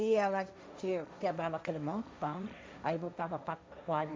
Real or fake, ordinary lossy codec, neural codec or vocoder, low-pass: fake; none; codec, 16 kHz, 1.1 kbps, Voila-Tokenizer; none